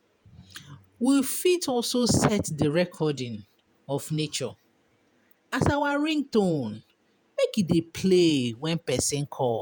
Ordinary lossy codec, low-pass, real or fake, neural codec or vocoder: none; none; fake; vocoder, 48 kHz, 128 mel bands, Vocos